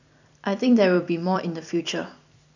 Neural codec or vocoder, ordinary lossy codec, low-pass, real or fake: vocoder, 44.1 kHz, 128 mel bands every 256 samples, BigVGAN v2; none; 7.2 kHz; fake